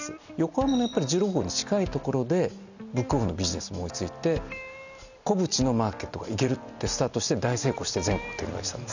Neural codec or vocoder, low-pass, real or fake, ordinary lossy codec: none; 7.2 kHz; real; none